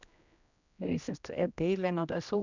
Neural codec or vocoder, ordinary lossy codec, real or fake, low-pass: codec, 16 kHz, 1 kbps, X-Codec, HuBERT features, trained on general audio; none; fake; 7.2 kHz